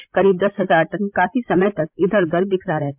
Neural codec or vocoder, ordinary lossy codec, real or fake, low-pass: codec, 16 kHz, 16 kbps, FreqCodec, larger model; MP3, 32 kbps; fake; 3.6 kHz